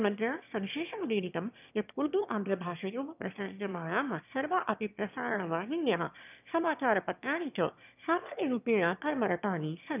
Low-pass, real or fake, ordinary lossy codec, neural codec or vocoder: 3.6 kHz; fake; none; autoencoder, 22.05 kHz, a latent of 192 numbers a frame, VITS, trained on one speaker